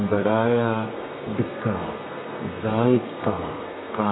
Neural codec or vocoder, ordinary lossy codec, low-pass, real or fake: codec, 32 kHz, 1.9 kbps, SNAC; AAC, 16 kbps; 7.2 kHz; fake